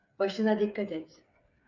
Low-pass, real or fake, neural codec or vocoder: 7.2 kHz; fake; codec, 16 kHz, 8 kbps, FreqCodec, smaller model